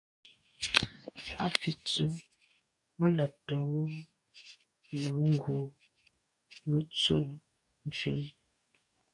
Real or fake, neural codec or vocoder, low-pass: fake; codec, 44.1 kHz, 2.6 kbps, DAC; 10.8 kHz